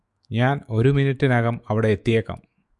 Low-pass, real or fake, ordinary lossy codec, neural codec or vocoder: 10.8 kHz; fake; Opus, 64 kbps; autoencoder, 48 kHz, 128 numbers a frame, DAC-VAE, trained on Japanese speech